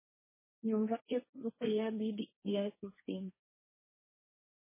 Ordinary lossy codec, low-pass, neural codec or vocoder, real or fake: MP3, 16 kbps; 3.6 kHz; codec, 16 kHz, 1.1 kbps, Voila-Tokenizer; fake